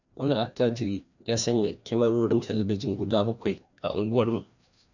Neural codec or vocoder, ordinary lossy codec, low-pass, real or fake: codec, 16 kHz, 1 kbps, FreqCodec, larger model; none; 7.2 kHz; fake